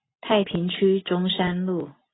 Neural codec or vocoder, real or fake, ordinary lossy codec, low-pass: none; real; AAC, 16 kbps; 7.2 kHz